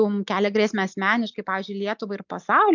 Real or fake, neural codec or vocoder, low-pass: real; none; 7.2 kHz